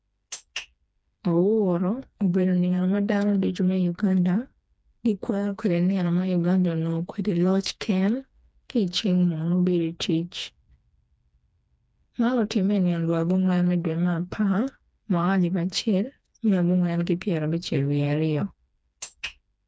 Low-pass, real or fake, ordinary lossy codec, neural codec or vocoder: none; fake; none; codec, 16 kHz, 2 kbps, FreqCodec, smaller model